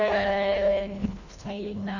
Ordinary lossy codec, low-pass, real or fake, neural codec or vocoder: none; 7.2 kHz; fake; codec, 24 kHz, 1.5 kbps, HILCodec